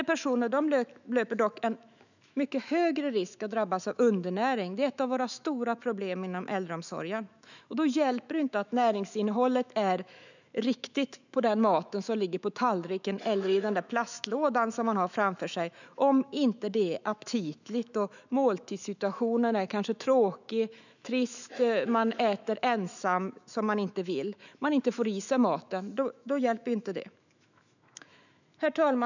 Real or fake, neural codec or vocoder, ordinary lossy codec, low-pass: fake; autoencoder, 48 kHz, 128 numbers a frame, DAC-VAE, trained on Japanese speech; none; 7.2 kHz